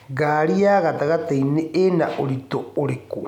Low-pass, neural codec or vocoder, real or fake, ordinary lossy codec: 19.8 kHz; none; real; none